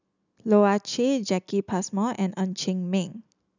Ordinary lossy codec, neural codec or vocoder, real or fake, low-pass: none; none; real; 7.2 kHz